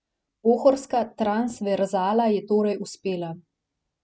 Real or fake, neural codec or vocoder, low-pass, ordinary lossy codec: real; none; none; none